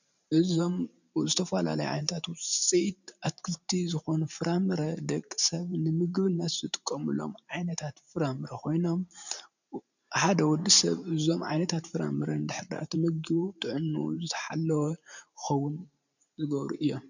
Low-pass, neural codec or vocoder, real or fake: 7.2 kHz; vocoder, 44.1 kHz, 128 mel bands every 512 samples, BigVGAN v2; fake